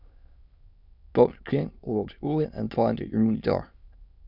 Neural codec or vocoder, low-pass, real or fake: autoencoder, 22.05 kHz, a latent of 192 numbers a frame, VITS, trained on many speakers; 5.4 kHz; fake